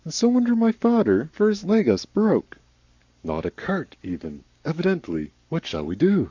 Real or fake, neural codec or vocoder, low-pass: fake; codec, 44.1 kHz, 7.8 kbps, Pupu-Codec; 7.2 kHz